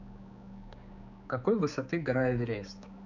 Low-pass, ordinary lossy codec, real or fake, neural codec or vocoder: 7.2 kHz; none; fake; codec, 16 kHz, 4 kbps, X-Codec, HuBERT features, trained on general audio